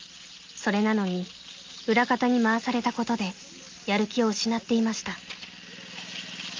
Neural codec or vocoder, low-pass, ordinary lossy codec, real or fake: none; 7.2 kHz; Opus, 32 kbps; real